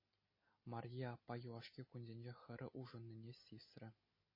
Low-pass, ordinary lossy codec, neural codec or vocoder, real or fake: 5.4 kHz; MP3, 24 kbps; none; real